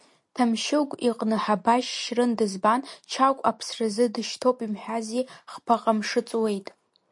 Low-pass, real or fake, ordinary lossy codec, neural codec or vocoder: 10.8 kHz; real; MP3, 48 kbps; none